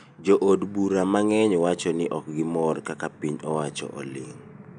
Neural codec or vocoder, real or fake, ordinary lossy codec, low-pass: none; real; none; 9.9 kHz